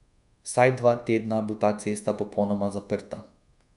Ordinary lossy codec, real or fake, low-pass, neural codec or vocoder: none; fake; 10.8 kHz; codec, 24 kHz, 1.2 kbps, DualCodec